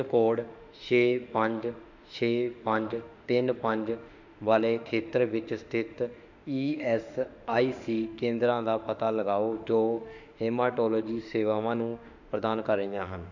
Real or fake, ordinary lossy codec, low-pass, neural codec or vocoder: fake; none; 7.2 kHz; autoencoder, 48 kHz, 32 numbers a frame, DAC-VAE, trained on Japanese speech